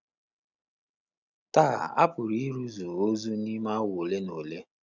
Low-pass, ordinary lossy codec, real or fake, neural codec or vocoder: 7.2 kHz; none; real; none